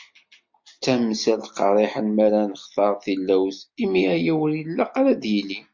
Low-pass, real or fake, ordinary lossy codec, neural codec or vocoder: 7.2 kHz; real; MP3, 48 kbps; none